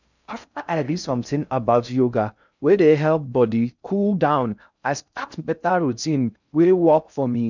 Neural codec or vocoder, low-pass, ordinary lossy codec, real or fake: codec, 16 kHz in and 24 kHz out, 0.6 kbps, FocalCodec, streaming, 4096 codes; 7.2 kHz; none; fake